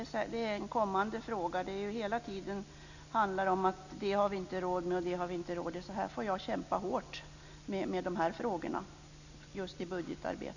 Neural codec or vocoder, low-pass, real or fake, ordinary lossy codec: none; 7.2 kHz; real; none